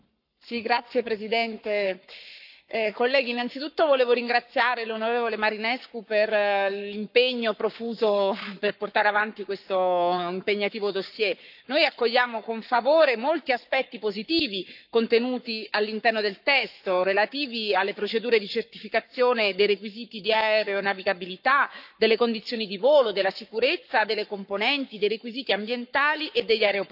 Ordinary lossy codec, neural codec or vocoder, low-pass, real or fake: none; codec, 44.1 kHz, 7.8 kbps, Pupu-Codec; 5.4 kHz; fake